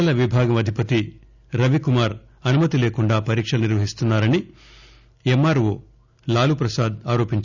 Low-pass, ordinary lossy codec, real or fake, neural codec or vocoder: 7.2 kHz; none; real; none